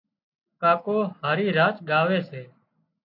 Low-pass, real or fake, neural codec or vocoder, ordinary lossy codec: 5.4 kHz; real; none; AAC, 32 kbps